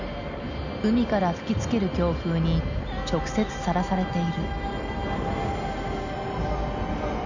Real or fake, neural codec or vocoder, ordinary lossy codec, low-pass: real; none; none; 7.2 kHz